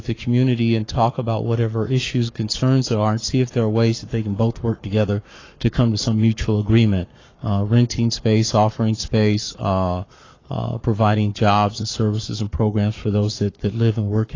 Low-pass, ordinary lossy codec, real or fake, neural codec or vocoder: 7.2 kHz; AAC, 32 kbps; fake; codec, 44.1 kHz, 7.8 kbps, Pupu-Codec